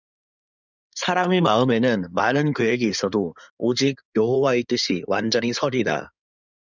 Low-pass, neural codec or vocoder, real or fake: 7.2 kHz; codec, 16 kHz in and 24 kHz out, 2.2 kbps, FireRedTTS-2 codec; fake